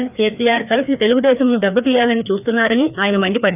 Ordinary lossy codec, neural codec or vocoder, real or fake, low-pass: none; codec, 16 kHz, 2 kbps, FreqCodec, larger model; fake; 3.6 kHz